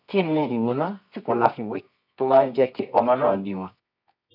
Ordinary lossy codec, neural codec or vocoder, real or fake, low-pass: none; codec, 24 kHz, 0.9 kbps, WavTokenizer, medium music audio release; fake; 5.4 kHz